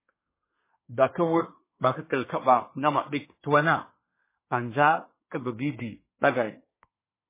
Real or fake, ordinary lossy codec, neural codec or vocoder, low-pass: fake; MP3, 16 kbps; codec, 24 kHz, 1 kbps, SNAC; 3.6 kHz